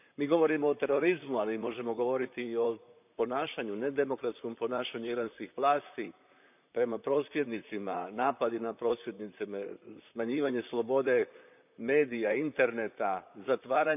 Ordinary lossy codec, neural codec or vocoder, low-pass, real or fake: none; vocoder, 44.1 kHz, 128 mel bands, Pupu-Vocoder; 3.6 kHz; fake